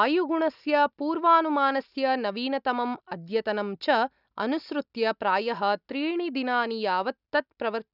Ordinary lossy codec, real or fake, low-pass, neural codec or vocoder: none; real; 5.4 kHz; none